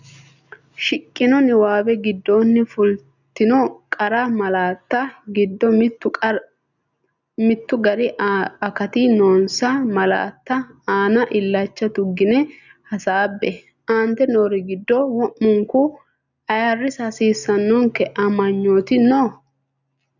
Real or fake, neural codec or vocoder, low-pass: real; none; 7.2 kHz